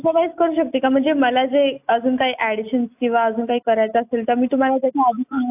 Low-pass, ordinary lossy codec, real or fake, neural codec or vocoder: 3.6 kHz; AAC, 32 kbps; real; none